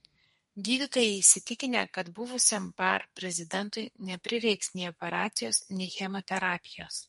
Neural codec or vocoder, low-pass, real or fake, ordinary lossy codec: codec, 32 kHz, 1.9 kbps, SNAC; 14.4 kHz; fake; MP3, 48 kbps